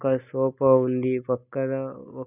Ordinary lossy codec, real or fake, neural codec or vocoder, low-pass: none; real; none; 3.6 kHz